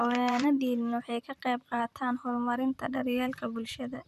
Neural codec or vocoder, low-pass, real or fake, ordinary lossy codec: vocoder, 44.1 kHz, 128 mel bands, Pupu-Vocoder; 14.4 kHz; fake; none